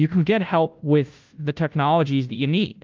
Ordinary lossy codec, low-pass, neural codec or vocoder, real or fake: Opus, 24 kbps; 7.2 kHz; codec, 16 kHz, 0.5 kbps, FunCodec, trained on Chinese and English, 25 frames a second; fake